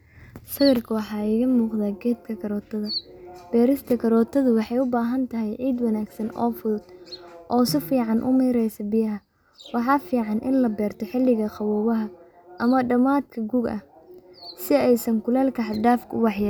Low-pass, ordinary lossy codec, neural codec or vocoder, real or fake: none; none; none; real